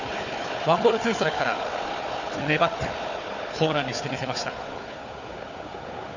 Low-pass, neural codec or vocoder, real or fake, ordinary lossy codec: 7.2 kHz; codec, 16 kHz, 4 kbps, FunCodec, trained on Chinese and English, 50 frames a second; fake; none